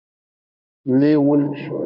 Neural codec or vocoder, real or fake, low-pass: codec, 16 kHz in and 24 kHz out, 1 kbps, XY-Tokenizer; fake; 5.4 kHz